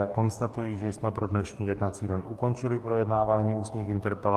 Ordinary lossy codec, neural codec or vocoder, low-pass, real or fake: Opus, 32 kbps; codec, 44.1 kHz, 2.6 kbps, DAC; 14.4 kHz; fake